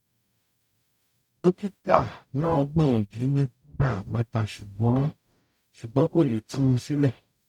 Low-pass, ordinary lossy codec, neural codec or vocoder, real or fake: 19.8 kHz; none; codec, 44.1 kHz, 0.9 kbps, DAC; fake